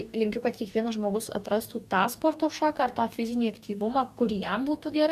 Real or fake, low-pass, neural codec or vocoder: fake; 14.4 kHz; codec, 44.1 kHz, 2.6 kbps, DAC